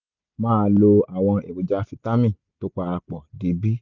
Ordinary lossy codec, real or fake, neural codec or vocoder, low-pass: none; real; none; 7.2 kHz